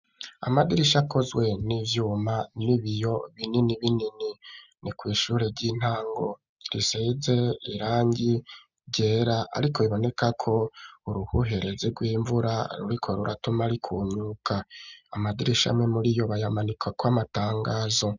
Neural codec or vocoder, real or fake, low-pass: none; real; 7.2 kHz